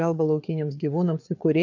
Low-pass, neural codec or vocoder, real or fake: 7.2 kHz; codec, 16 kHz, 4 kbps, FreqCodec, larger model; fake